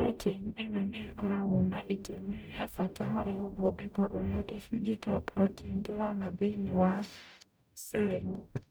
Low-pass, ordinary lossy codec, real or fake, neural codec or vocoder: none; none; fake; codec, 44.1 kHz, 0.9 kbps, DAC